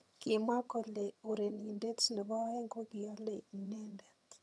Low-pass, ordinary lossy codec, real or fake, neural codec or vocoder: none; none; fake; vocoder, 22.05 kHz, 80 mel bands, HiFi-GAN